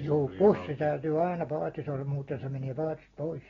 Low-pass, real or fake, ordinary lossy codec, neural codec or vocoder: 7.2 kHz; real; AAC, 24 kbps; none